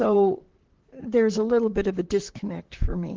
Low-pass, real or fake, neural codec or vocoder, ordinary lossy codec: 7.2 kHz; fake; vocoder, 44.1 kHz, 128 mel bands, Pupu-Vocoder; Opus, 16 kbps